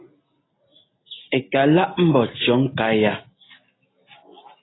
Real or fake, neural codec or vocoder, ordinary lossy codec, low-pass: real; none; AAC, 16 kbps; 7.2 kHz